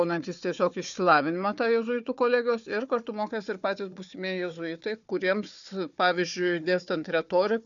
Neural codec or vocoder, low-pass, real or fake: codec, 16 kHz, 4 kbps, FunCodec, trained on Chinese and English, 50 frames a second; 7.2 kHz; fake